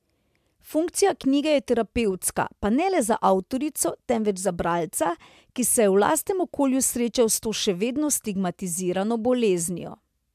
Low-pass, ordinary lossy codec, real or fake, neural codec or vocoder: 14.4 kHz; MP3, 96 kbps; real; none